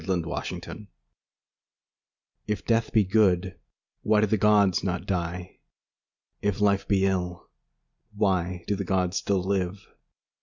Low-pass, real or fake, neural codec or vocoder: 7.2 kHz; real; none